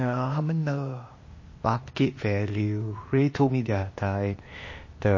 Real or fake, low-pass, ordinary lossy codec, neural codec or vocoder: fake; 7.2 kHz; MP3, 32 kbps; codec, 16 kHz, 0.8 kbps, ZipCodec